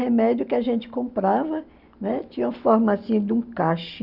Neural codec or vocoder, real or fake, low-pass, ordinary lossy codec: none; real; 5.4 kHz; Opus, 64 kbps